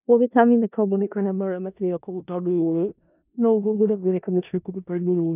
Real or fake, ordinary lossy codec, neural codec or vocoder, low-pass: fake; none; codec, 16 kHz in and 24 kHz out, 0.4 kbps, LongCat-Audio-Codec, four codebook decoder; 3.6 kHz